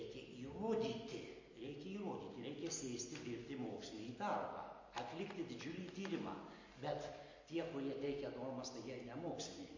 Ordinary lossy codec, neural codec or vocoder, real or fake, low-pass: MP3, 32 kbps; none; real; 7.2 kHz